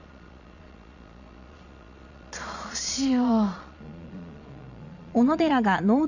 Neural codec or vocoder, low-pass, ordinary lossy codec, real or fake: vocoder, 22.05 kHz, 80 mel bands, Vocos; 7.2 kHz; none; fake